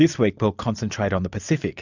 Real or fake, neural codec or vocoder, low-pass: real; none; 7.2 kHz